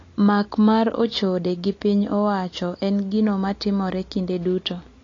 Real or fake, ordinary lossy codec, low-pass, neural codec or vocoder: real; AAC, 32 kbps; 7.2 kHz; none